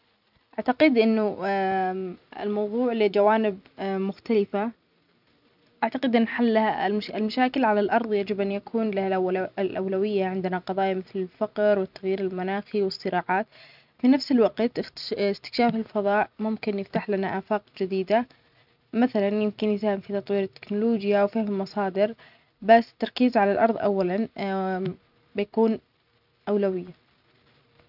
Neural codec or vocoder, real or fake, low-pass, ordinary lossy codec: vocoder, 44.1 kHz, 128 mel bands every 256 samples, BigVGAN v2; fake; 5.4 kHz; none